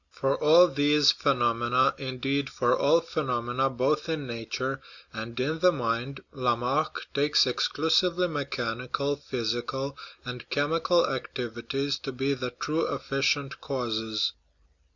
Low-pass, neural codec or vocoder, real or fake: 7.2 kHz; none; real